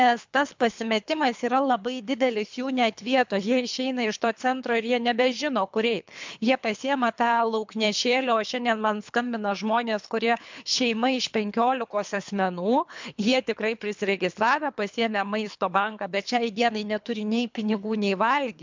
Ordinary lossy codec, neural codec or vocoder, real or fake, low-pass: MP3, 64 kbps; codec, 24 kHz, 3 kbps, HILCodec; fake; 7.2 kHz